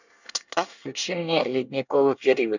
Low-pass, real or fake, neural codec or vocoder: 7.2 kHz; fake; codec, 24 kHz, 1 kbps, SNAC